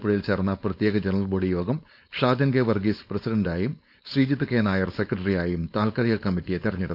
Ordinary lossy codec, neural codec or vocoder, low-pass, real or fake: none; codec, 16 kHz, 4.8 kbps, FACodec; 5.4 kHz; fake